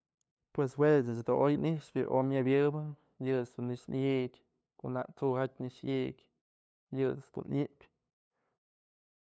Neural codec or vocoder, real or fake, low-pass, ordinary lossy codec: codec, 16 kHz, 2 kbps, FunCodec, trained on LibriTTS, 25 frames a second; fake; none; none